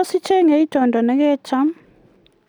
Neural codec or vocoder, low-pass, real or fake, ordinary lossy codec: none; 19.8 kHz; real; none